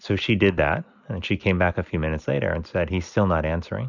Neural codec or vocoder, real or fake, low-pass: none; real; 7.2 kHz